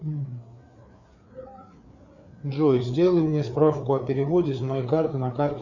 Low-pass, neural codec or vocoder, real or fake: 7.2 kHz; codec, 16 kHz, 4 kbps, FreqCodec, larger model; fake